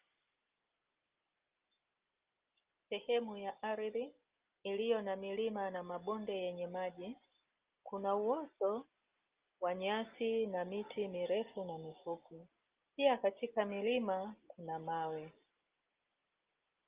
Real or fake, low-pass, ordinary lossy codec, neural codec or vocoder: real; 3.6 kHz; Opus, 32 kbps; none